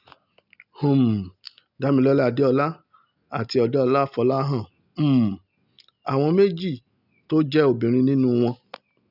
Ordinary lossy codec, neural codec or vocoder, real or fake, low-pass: none; none; real; 5.4 kHz